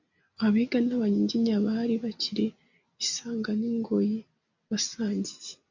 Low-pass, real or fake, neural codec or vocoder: 7.2 kHz; real; none